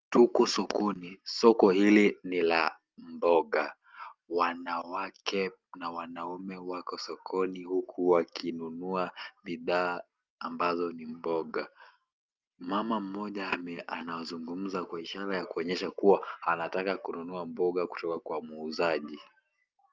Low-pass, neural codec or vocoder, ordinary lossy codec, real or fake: 7.2 kHz; none; Opus, 24 kbps; real